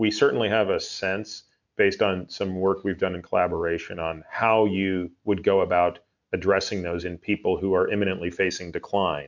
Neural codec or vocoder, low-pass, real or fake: none; 7.2 kHz; real